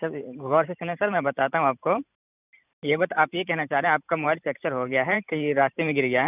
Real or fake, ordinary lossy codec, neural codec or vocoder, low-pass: fake; none; vocoder, 44.1 kHz, 128 mel bands every 512 samples, BigVGAN v2; 3.6 kHz